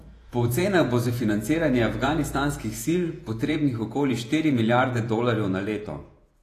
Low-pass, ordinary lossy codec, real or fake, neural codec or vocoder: 14.4 kHz; AAC, 48 kbps; fake; vocoder, 48 kHz, 128 mel bands, Vocos